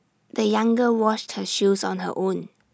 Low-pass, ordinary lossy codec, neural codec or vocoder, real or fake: none; none; none; real